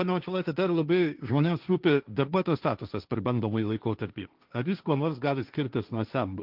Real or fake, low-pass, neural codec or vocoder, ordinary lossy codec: fake; 5.4 kHz; codec, 16 kHz, 1.1 kbps, Voila-Tokenizer; Opus, 24 kbps